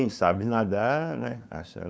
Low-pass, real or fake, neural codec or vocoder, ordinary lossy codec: none; fake; codec, 16 kHz, 2 kbps, FunCodec, trained on LibriTTS, 25 frames a second; none